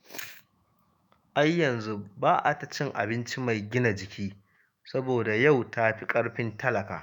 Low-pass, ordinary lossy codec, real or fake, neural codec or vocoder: none; none; fake; autoencoder, 48 kHz, 128 numbers a frame, DAC-VAE, trained on Japanese speech